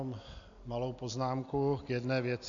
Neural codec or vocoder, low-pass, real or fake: none; 7.2 kHz; real